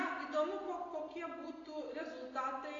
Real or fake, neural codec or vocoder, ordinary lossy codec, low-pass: real; none; AAC, 32 kbps; 7.2 kHz